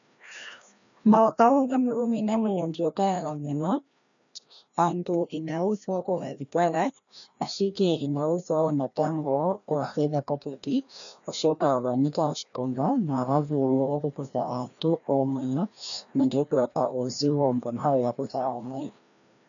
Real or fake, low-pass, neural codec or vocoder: fake; 7.2 kHz; codec, 16 kHz, 1 kbps, FreqCodec, larger model